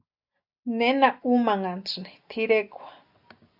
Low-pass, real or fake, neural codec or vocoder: 5.4 kHz; real; none